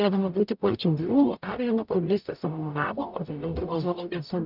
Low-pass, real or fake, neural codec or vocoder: 5.4 kHz; fake; codec, 44.1 kHz, 0.9 kbps, DAC